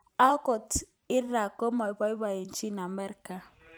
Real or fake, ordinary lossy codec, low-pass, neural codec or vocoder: fake; none; none; vocoder, 44.1 kHz, 128 mel bands every 512 samples, BigVGAN v2